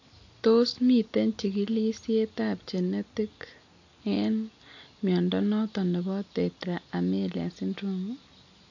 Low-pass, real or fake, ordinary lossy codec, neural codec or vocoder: 7.2 kHz; real; AAC, 48 kbps; none